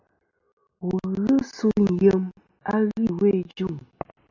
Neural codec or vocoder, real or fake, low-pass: none; real; 7.2 kHz